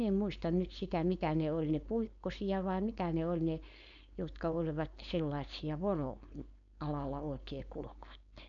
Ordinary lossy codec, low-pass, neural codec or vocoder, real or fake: none; 7.2 kHz; codec, 16 kHz, 4.8 kbps, FACodec; fake